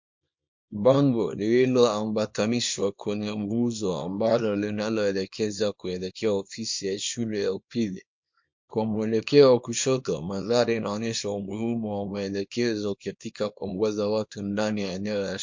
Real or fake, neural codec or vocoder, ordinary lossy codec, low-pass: fake; codec, 24 kHz, 0.9 kbps, WavTokenizer, small release; MP3, 48 kbps; 7.2 kHz